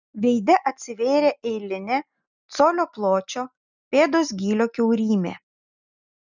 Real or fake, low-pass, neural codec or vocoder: real; 7.2 kHz; none